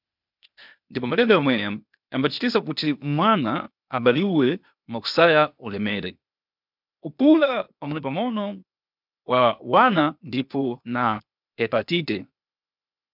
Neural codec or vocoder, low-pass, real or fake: codec, 16 kHz, 0.8 kbps, ZipCodec; 5.4 kHz; fake